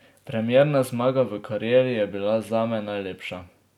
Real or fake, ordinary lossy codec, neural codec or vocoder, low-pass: real; none; none; 19.8 kHz